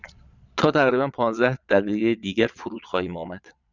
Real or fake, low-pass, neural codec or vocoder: fake; 7.2 kHz; vocoder, 22.05 kHz, 80 mel bands, Vocos